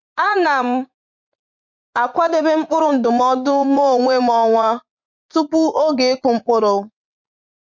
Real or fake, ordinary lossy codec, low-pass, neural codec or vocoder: fake; MP3, 48 kbps; 7.2 kHz; vocoder, 44.1 kHz, 80 mel bands, Vocos